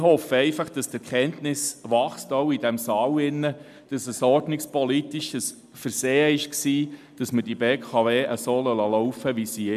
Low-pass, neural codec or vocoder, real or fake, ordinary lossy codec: 14.4 kHz; none; real; MP3, 96 kbps